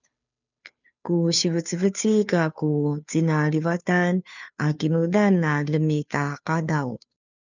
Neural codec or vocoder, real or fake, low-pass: codec, 16 kHz, 2 kbps, FunCodec, trained on Chinese and English, 25 frames a second; fake; 7.2 kHz